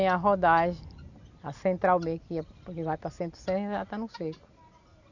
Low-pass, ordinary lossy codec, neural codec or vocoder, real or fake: 7.2 kHz; none; none; real